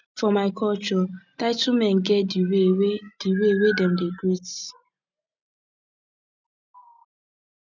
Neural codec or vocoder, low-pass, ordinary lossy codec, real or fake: none; 7.2 kHz; none; real